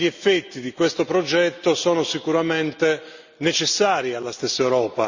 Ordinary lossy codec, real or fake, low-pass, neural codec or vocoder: Opus, 64 kbps; real; 7.2 kHz; none